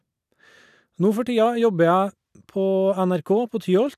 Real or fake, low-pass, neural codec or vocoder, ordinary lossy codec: real; 14.4 kHz; none; none